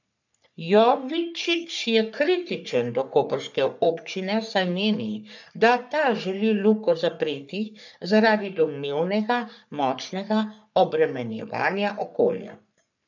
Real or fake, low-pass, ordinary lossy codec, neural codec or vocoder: fake; 7.2 kHz; none; codec, 44.1 kHz, 3.4 kbps, Pupu-Codec